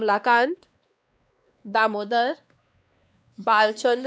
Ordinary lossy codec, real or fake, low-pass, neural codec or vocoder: none; fake; none; codec, 16 kHz, 2 kbps, X-Codec, WavLM features, trained on Multilingual LibriSpeech